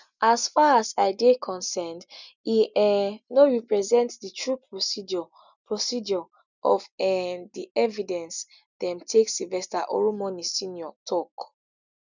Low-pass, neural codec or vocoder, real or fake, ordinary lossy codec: 7.2 kHz; none; real; none